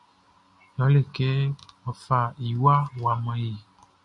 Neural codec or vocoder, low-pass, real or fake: none; 10.8 kHz; real